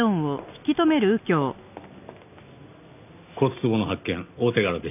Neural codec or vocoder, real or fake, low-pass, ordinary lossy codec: none; real; 3.6 kHz; none